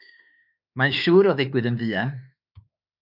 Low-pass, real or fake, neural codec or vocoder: 5.4 kHz; fake; autoencoder, 48 kHz, 32 numbers a frame, DAC-VAE, trained on Japanese speech